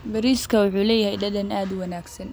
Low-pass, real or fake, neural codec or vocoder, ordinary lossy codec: none; real; none; none